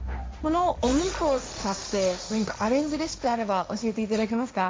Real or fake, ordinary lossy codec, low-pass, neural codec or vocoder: fake; none; none; codec, 16 kHz, 1.1 kbps, Voila-Tokenizer